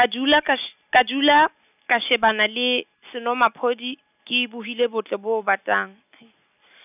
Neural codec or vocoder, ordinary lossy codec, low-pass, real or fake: none; none; 3.6 kHz; real